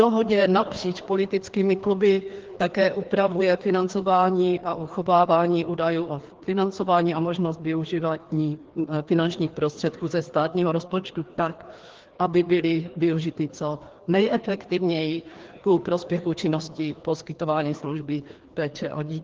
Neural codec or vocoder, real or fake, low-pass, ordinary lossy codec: codec, 16 kHz, 2 kbps, FreqCodec, larger model; fake; 7.2 kHz; Opus, 16 kbps